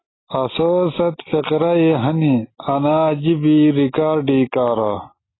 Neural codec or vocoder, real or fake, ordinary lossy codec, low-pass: none; real; AAC, 16 kbps; 7.2 kHz